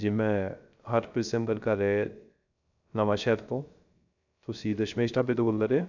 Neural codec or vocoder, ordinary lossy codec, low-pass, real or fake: codec, 16 kHz, 0.3 kbps, FocalCodec; none; 7.2 kHz; fake